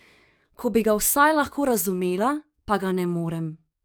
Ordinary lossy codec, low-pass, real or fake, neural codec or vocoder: none; none; fake; codec, 44.1 kHz, 7.8 kbps, DAC